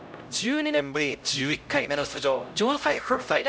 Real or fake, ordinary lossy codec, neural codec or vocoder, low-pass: fake; none; codec, 16 kHz, 0.5 kbps, X-Codec, HuBERT features, trained on LibriSpeech; none